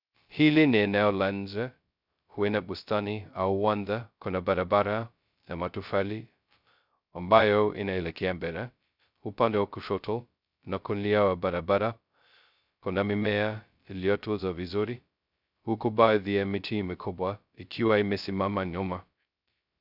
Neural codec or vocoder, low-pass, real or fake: codec, 16 kHz, 0.2 kbps, FocalCodec; 5.4 kHz; fake